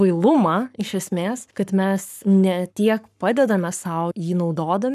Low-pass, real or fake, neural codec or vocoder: 14.4 kHz; fake; codec, 44.1 kHz, 7.8 kbps, Pupu-Codec